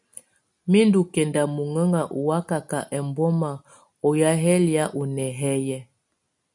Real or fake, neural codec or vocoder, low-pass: real; none; 10.8 kHz